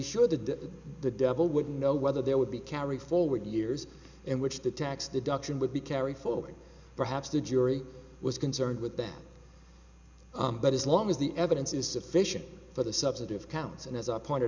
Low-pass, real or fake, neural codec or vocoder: 7.2 kHz; real; none